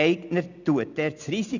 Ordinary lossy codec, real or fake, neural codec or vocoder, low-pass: none; real; none; 7.2 kHz